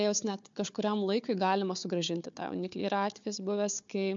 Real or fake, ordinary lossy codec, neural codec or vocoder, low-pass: fake; MP3, 64 kbps; codec, 16 kHz, 4 kbps, FunCodec, trained on Chinese and English, 50 frames a second; 7.2 kHz